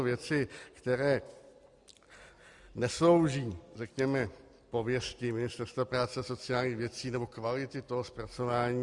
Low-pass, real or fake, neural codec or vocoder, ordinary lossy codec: 10.8 kHz; real; none; Opus, 64 kbps